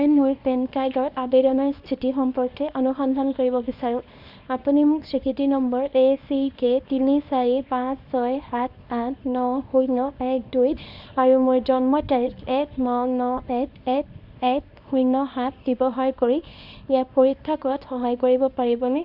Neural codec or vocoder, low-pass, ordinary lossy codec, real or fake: codec, 24 kHz, 0.9 kbps, WavTokenizer, small release; 5.4 kHz; none; fake